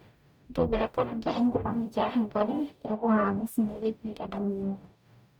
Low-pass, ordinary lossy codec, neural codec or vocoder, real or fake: 19.8 kHz; none; codec, 44.1 kHz, 0.9 kbps, DAC; fake